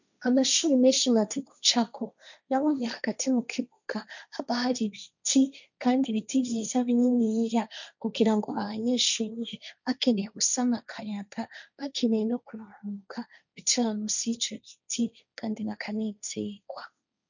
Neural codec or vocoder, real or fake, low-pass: codec, 16 kHz, 1.1 kbps, Voila-Tokenizer; fake; 7.2 kHz